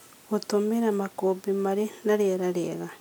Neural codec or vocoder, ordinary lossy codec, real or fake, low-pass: none; none; real; none